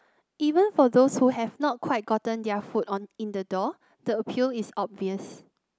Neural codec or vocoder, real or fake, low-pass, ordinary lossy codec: none; real; none; none